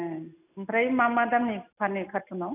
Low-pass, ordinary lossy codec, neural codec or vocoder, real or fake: 3.6 kHz; none; none; real